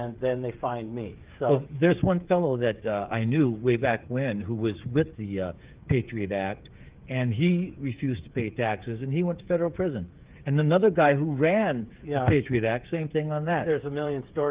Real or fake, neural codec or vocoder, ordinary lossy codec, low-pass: fake; codec, 16 kHz, 8 kbps, FreqCodec, smaller model; Opus, 32 kbps; 3.6 kHz